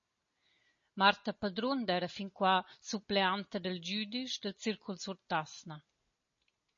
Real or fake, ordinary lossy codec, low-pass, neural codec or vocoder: real; MP3, 32 kbps; 7.2 kHz; none